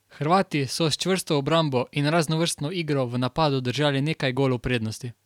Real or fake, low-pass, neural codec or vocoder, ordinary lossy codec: real; 19.8 kHz; none; none